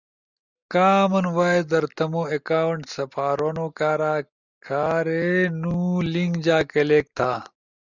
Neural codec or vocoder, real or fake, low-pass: none; real; 7.2 kHz